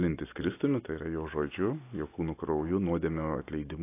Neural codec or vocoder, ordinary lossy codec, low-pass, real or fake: none; AAC, 24 kbps; 3.6 kHz; real